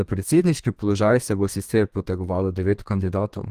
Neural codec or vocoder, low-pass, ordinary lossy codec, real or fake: codec, 32 kHz, 1.9 kbps, SNAC; 14.4 kHz; Opus, 24 kbps; fake